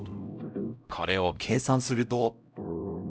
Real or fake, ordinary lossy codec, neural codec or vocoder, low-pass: fake; none; codec, 16 kHz, 0.5 kbps, X-Codec, HuBERT features, trained on LibriSpeech; none